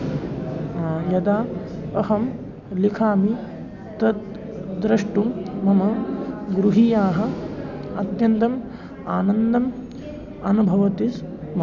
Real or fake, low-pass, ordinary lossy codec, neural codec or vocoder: real; 7.2 kHz; none; none